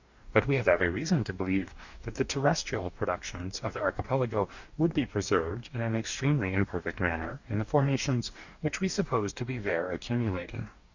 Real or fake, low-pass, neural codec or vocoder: fake; 7.2 kHz; codec, 44.1 kHz, 2.6 kbps, DAC